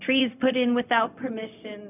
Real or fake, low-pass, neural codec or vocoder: fake; 3.6 kHz; codec, 16 kHz, 0.4 kbps, LongCat-Audio-Codec